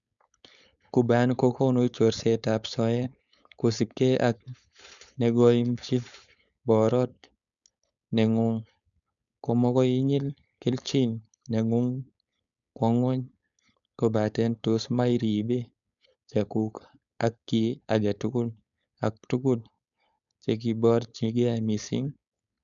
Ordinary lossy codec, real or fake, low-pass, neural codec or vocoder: none; fake; 7.2 kHz; codec, 16 kHz, 4.8 kbps, FACodec